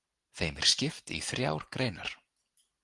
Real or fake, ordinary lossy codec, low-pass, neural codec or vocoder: real; Opus, 24 kbps; 10.8 kHz; none